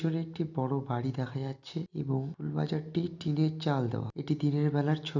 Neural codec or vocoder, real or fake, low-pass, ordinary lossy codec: none; real; 7.2 kHz; none